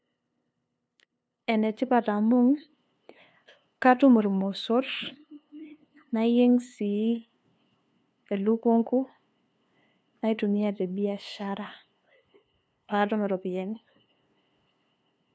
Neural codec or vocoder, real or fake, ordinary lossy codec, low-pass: codec, 16 kHz, 2 kbps, FunCodec, trained on LibriTTS, 25 frames a second; fake; none; none